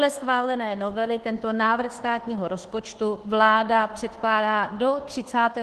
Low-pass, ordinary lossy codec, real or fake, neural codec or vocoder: 10.8 kHz; Opus, 16 kbps; fake; codec, 24 kHz, 1.2 kbps, DualCodec